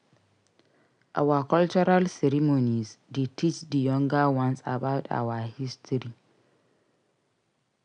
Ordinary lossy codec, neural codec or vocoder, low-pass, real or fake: none; none; 9.9 kHz; real